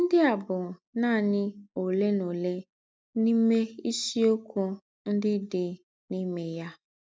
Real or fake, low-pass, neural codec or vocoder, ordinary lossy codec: real; none; none; none